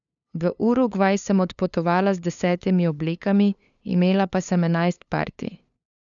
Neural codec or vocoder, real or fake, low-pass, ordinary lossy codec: codec, 16 kHz, 2 kbps, FunCodec, trained on LibriTTS, 25 frames a second; fake; 7.2 kHz; none